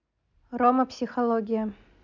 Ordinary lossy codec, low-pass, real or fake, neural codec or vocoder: none; 7.2 kHz; real; none